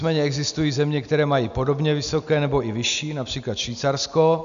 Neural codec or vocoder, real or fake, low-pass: none; real; 7.2 kHz